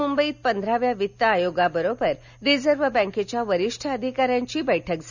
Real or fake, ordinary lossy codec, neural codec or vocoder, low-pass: real; none; none; 7.2 kHz